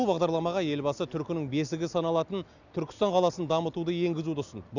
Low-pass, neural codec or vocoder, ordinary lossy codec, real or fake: 7.2 kHz; none; none; real